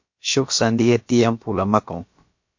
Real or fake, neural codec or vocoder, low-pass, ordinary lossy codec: fake; codec, 16 kHz, about 1 kbps, DyCAST, with the encoder's durations; 7.2 kHz; MP3, 48 kbps